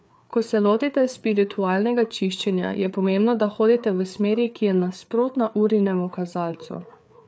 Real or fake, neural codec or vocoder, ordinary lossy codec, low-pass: fake; codec, 16 kHz, 4 kbps, FreqCodec, larger model; none; none